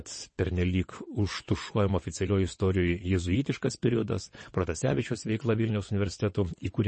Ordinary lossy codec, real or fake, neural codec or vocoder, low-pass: MP3, 32 kbps; fake; vocoder, 22.05 kHz, 80 mel bands, WaveNeXt; 9.9 kHz